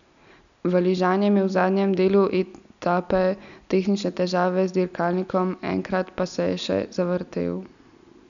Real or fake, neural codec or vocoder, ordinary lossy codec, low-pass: real; none; none; 7.2 kHz